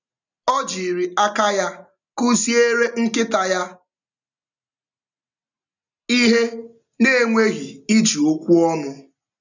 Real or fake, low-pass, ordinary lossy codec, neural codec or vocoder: real; 7.2 kHz; none; none